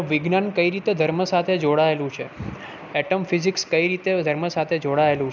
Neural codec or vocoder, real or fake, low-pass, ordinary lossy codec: none; real; 7.2 kHz; none